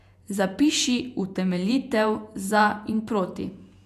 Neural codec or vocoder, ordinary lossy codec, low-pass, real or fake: vocoder, 48 kHz, 128 mel bands, Vocos; none; 14.4 kHz; fake